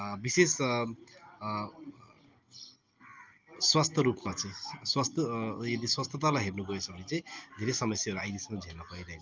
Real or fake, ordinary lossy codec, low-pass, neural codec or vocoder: real; Opus, 24 kbps; 7.2 kHz; none